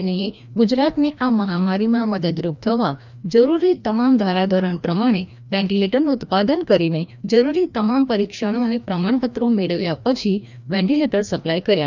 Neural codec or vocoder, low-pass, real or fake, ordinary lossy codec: codec, 16 kHz, 1 kbps, FreqCodec, larger model; 7.2 kHz; fake; none